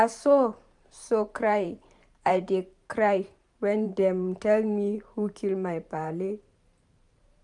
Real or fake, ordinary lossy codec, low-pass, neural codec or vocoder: fake; none; 10.8 kHz; vocoder, 44.1 kHz, 128 mel bands every 512 samples, BigVGAN v2